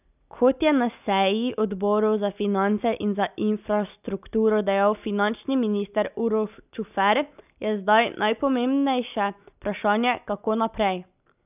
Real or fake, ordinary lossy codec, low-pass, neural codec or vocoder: real; none; 3.6 kHz; none